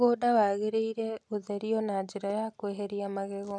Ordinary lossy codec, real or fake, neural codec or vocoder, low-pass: none; real; none; none